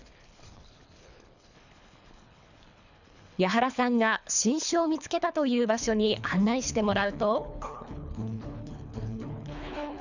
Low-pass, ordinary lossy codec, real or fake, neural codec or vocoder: 7.2 kHz; none; fake; codec, 24 kHz, 3 kbps, HILCodec